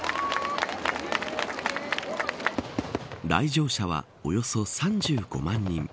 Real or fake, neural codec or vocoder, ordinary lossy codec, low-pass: real; none; none; none